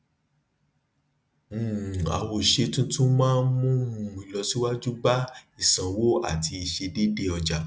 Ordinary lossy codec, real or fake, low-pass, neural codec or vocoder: none; real; none; none